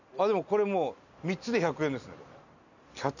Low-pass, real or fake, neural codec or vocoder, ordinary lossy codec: 7.2 kHz; real; none; none